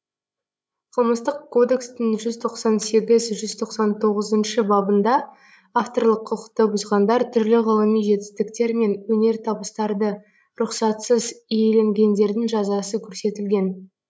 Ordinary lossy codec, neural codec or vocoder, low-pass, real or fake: none; codec, 16 kHz, 16 kbps, FreqCodec, larger model; none; fake